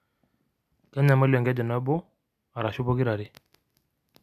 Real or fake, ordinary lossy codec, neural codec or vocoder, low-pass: real; none; none; 14.4 kHz